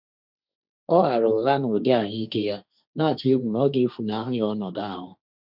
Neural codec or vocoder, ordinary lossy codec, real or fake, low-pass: codec, 16 kHz, 1.1 kbps, Voila-Tokenizer; none; fake; 5.4 kHz